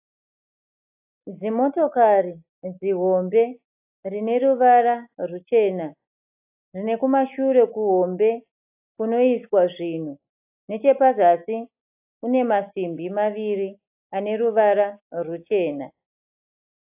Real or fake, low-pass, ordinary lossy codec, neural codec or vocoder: real; 3.6 kHz; AAC, 32 kbps; none